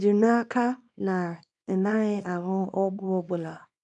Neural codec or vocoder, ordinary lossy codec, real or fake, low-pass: codec, 24 kHz, 0.9 kbps, WavTokenizer, small release; none; fake; 10.8 kHz